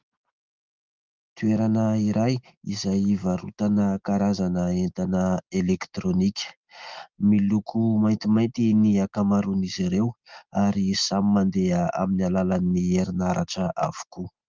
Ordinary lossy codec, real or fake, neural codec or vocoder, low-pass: Opus, 32 kbps; real; none; 7.2 kHz